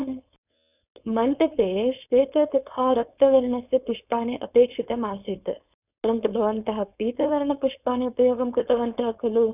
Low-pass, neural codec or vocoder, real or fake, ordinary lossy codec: 3.6 kHz; codec, 16 kHz, 4.8 kbps, FACodec; fake; none